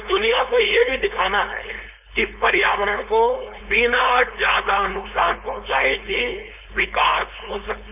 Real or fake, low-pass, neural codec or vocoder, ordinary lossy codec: fake; 3.6 kHz; codec, 16 kHz, 4.8 kbps, FACodec; none